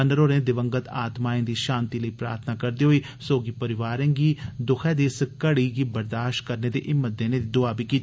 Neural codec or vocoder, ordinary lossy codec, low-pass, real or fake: none; none; none; real